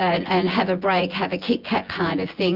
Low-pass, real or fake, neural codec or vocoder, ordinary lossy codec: 5.4 kHz; fake; vocoder, 24 kHz, 100 mel bands, Vocos; Opus, 32 kbps